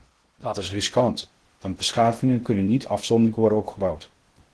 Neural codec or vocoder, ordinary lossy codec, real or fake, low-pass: codec, 16 kHz in and 24 kHz out, 0.6 kbps, FocalCodec, streaming, 2048 codes; Opus, 16 kbps; fake; 10.8 kHz